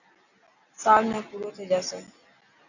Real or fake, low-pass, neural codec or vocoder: real; 7.2 kHz; none